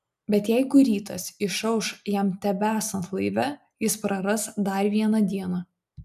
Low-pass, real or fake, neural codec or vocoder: 14.4 kHz; real; none